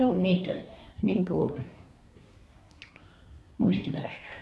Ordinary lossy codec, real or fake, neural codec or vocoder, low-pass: none; fake; codec, 24 kHz, 1 kbps, SNAC; none